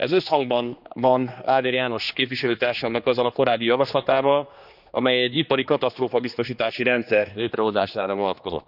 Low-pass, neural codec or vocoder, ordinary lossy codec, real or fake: 5.4 kHz; codec, 16 kHz, 2 kbps, X-Codec, HuBERT features, trained on general audio; none; fake